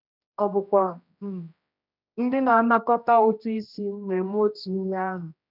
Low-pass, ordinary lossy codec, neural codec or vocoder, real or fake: 5.4 kHz; none; codec, 16 kHz, 1 kbps, X-Codec, HuBERT features, trained on general audio; fake